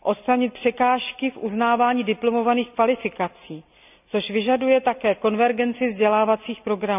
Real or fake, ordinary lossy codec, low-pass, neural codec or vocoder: real; none; 3.6 kHz; none